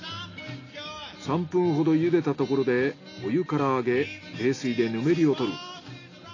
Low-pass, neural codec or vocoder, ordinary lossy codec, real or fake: 7.2 kHz; none; AAC, 32 kbps; real